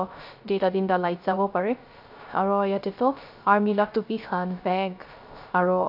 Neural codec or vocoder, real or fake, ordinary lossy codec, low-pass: codec, 16 kHz, 0.3 kbps, FocalCodec; fake; none; 5.4 kHz